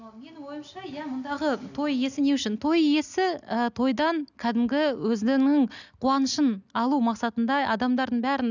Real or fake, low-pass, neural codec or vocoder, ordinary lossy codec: real; 7.2 kHz; none; none